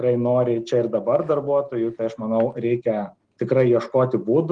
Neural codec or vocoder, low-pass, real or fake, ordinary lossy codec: none; 9.9 kHz; real; Opus, 24 kbps